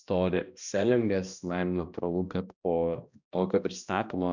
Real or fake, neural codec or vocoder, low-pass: fake; codec, 16 kHz, 1 kbps, X-Codec, HuBERT features, trained on balanced general audio; 7.2 kHz